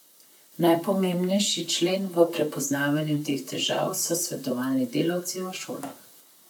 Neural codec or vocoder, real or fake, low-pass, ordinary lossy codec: vocoder, 44.1 kHz, 128 mel bands, Pupu-Vocoder; fake; none; none